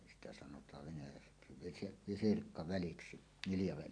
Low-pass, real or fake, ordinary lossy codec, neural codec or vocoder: 9.9 kHz; fake; AAC, 64 kbps; vocoder, 44.1 kHz, 128 mel bands every 256 samples, BigVGAN v2